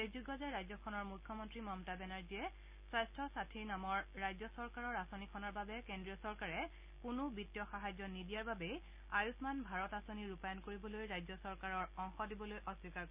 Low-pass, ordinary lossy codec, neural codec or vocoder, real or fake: 3.6 kHz; Opus, 64 kbps; none; real